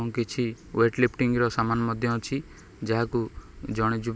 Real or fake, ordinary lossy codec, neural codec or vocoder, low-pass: real; none; none; none